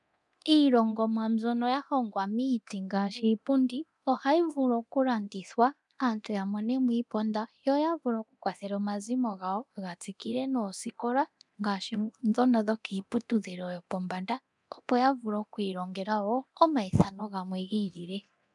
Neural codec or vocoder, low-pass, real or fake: codec, 24 kHz, 0.9 kbps, DualCodec; 10.8 kHz; fake